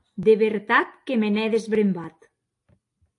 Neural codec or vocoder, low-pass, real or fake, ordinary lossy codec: none; 10.8 kHz; real; AAC, 48 kbps